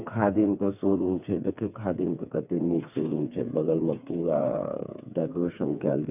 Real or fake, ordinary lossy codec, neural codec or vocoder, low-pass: fake; none; codec, 16 kHz, 4 kbps, FreqCodec, smaller model; 3.6 kHz